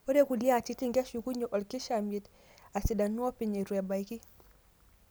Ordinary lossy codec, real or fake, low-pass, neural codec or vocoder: none; real; none; none